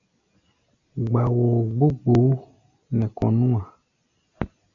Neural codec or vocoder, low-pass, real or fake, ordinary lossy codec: none; 7.2 kHz; real; MP3, 96 kbps